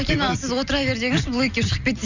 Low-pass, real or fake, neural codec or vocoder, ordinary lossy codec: 7.2 kHz; real; none; none